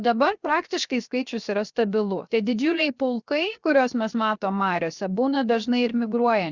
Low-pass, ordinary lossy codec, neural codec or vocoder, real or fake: 7.2 kHz; Opus, 64 kbps; codec, 16 kHz, 0.7 kbps, FocalCodec; fake